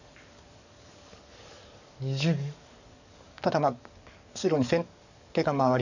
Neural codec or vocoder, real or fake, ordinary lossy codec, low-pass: codec, 44.1 kHz, 7.8 kbps, DAC; fake; none; 7.2 kHz